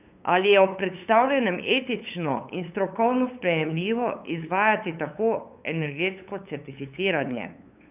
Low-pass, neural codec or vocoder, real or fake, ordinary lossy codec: 3.6 kHz; codec, 16 kHz, 8 kbps, FunCodec, trained on LibriTTS, 25 frames a second; fake; none